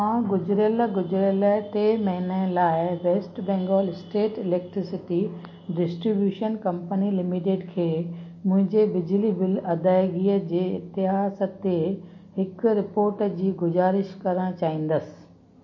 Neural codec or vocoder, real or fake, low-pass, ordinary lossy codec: none; real; 7.2 kHz; MP3, 32 kbps